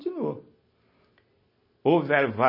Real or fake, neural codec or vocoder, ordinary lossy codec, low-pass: real; none; MP3, 24 kbps; 5.4 kHz